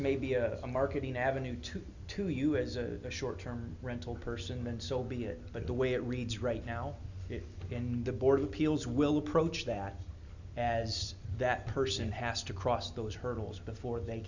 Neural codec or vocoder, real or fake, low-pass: none; real; 7.2 kHz